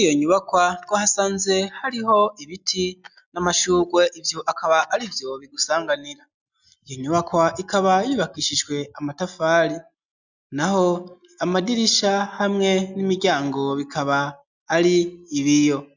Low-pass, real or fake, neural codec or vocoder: 7.2 kHz; real; none